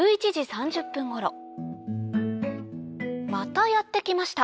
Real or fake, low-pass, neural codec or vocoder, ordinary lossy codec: real; none; none; none